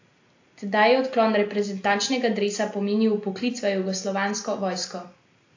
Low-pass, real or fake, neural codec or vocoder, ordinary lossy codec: 7.2 kHz; real; none; AAC, 48 kbps